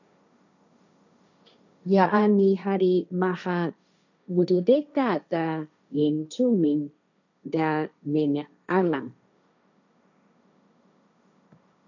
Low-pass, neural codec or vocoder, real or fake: 7.2 kHz; codec, 16 kHz, 1.1 kbps, Voila-Tokenizer; fake